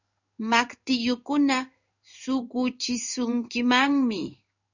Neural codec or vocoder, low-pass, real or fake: codec, 16 kHz in and 24 kHz out, 1 kbps, XY-Tokenizer; 7.2 kHz; fake